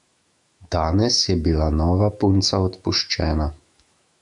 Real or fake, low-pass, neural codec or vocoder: fake; 10.8 kHz; autoencoder, 48 kHz, 128 numbers a frame, DAC-VAE, trained on Japanese speech